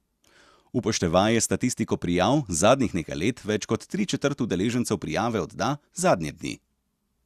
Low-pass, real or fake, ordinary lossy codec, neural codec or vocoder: 14.4 kHz; real; Opus, 64 kbps; none